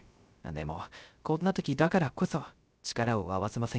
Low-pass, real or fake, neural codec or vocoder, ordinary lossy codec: none; fake; codec, 16 kHz, 0.3 kbps, FocalCodec; none